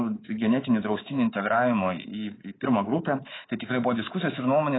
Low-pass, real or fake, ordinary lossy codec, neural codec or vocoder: 7.2 kHz; fake; AAC, 16 kbps; codec, 24 kHz, 3.1 kbps, DualCodec